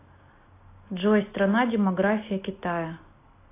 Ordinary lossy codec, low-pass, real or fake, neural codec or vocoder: AAC, 24 kbps; 3.6 kHz; real; none